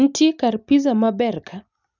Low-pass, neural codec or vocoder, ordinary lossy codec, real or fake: 7.2 kHz; none; none; real